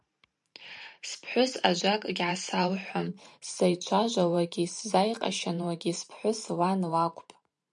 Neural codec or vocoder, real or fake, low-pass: vocoder, 44.1 kHz, 128 mel bands every 512 samples, BigVGAN v2; fake; 10.8 kHz